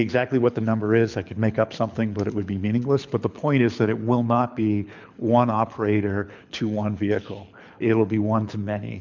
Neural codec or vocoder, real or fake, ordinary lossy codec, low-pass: codec, 24 kHz, 6 kbps, HILCodec; fake; MP3, 64 kbps; 7.2 kHz